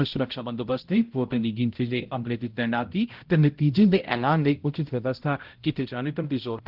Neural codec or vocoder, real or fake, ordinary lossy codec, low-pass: codec, 16 kHz, 0.5 kbps, X-Codec, HuBERT features, trained on balanced general audio; fake; Opus, 16 kbps; 5.4 kHz